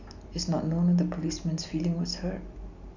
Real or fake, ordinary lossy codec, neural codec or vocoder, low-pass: real; none; none; 7.2 kHz